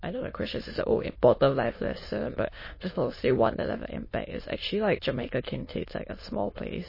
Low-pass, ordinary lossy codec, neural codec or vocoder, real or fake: 5.4 kHz; MP3, 24 kbps; autoencoder, 22.05 kHz, a latent of 192 numbers a frame, VITS, trained on many speakers; fake